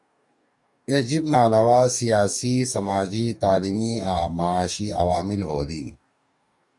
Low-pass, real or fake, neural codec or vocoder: 10.8 kHz; fake; codec, 44.1 kHz, 2.6 kbps, DAC